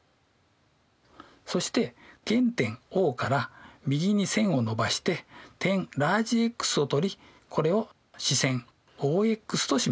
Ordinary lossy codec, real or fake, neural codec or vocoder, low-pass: none; real; none; none